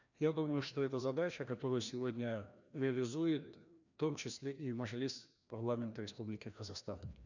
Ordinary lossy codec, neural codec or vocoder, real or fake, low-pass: none; codec, 16 kHz, 1 kbps, FreqCodec, larger model; fake; 7.2 kHz